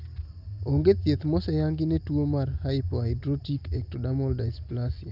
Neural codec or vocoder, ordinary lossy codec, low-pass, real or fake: none; Opus, 32 kbps; 5.4 kHz; real